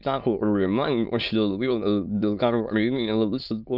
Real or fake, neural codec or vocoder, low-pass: fake; autoencoder, 22.05 kHz, a latent of 192 numbers a frame, VITS, trained on many speakers; 5.4 kHz